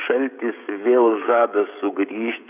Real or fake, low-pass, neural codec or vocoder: fake; 3.6 kHz; codec, 16 kHz, 16 kbps, FreqCodec, smaller model